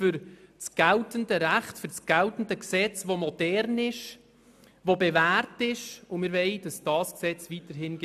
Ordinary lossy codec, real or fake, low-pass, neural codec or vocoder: AAC, 96 kbps; real; 14.4 kHz; none